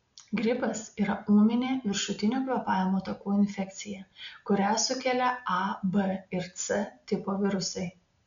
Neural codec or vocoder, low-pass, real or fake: none; 7.2 kHz; real